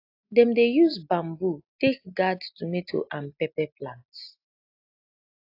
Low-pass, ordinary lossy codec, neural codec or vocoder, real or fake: 5.4 kHz; AAC, 32 kbps; none; real